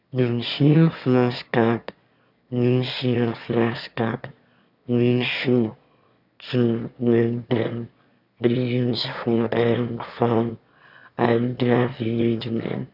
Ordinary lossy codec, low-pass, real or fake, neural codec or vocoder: none; 5.4 kHz; fake; autoencoder, 22.05 kHz, a latent of 192 numbers a frame, VITS, trained on one speaker